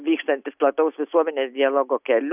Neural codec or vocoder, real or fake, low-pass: none; real; 3.6 kHz